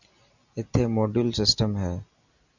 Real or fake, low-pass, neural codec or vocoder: real; 7.2 kHz; none